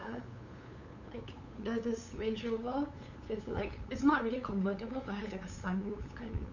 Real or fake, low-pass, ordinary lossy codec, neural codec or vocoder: fake; 7.2 kHz; none; codec, 16 kHz, 8 kbps, FunCodec, trained on LibriTTS, 25 frames a second